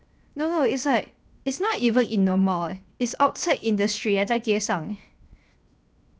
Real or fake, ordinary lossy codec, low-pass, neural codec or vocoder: fake; none; none; codec, 16 kHz, 0.7 kbps, FocalCodec